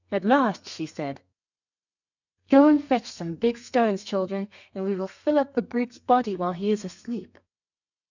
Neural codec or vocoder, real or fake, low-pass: codec, 32 kHz, 1.9 kbps, SNAC; fake; 7.2 kHz